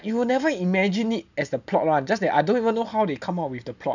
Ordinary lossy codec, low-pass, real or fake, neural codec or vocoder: none; 7.2 kHz; real; none